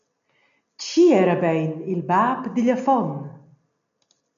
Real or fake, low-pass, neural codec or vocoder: real; 7.2 kHz; none